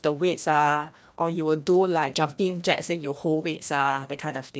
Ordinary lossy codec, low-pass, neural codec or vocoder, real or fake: none; none; codec, 16 kHz, 1 kbps, FreqCodec, larger model; fake